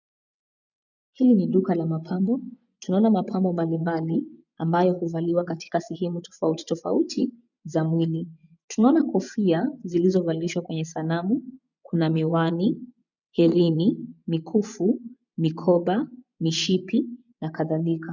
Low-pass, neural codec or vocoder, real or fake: 7.2 kHz; vocoder, 24 kHz, 100 mel bands, Vocos; fake